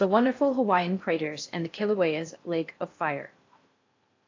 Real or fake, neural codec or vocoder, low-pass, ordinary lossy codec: fake; codec, 16 kHz in and 24 kHz out, 0.6 kbps, FocalCodec, streaming, 4096 codes; 7.2 kHz; AAC, 48 kbps